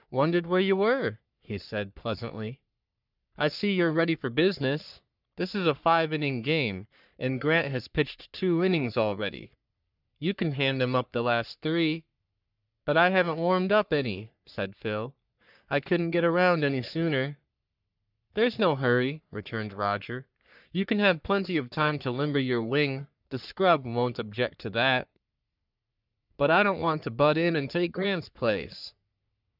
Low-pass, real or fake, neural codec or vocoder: 5.4 kHz; fake; codec, 44.1 kHz, 3.4 kbps, Pupu-Codec